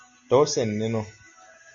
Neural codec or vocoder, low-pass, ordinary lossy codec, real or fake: none; 7.2 kHz; Opus, 64 kbps; real